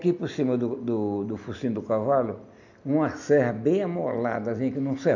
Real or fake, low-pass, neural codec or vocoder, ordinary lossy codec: real; 7.2 kHz; none; none